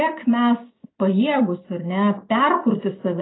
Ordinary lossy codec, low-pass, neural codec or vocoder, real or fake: AAC, 16 kbps; 7.2 kHz; none; real